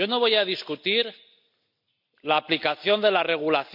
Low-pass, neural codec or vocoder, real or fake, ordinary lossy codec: 5.4 kHz; none; real; none